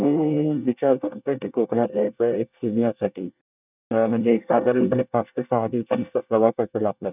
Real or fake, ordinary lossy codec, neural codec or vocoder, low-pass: fake; none; codec, 24 kHz, 1 kbps, SNAC; 3.6 kHz